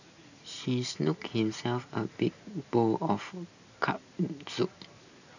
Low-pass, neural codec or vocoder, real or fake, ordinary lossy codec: 7.2 kHz; none; real; none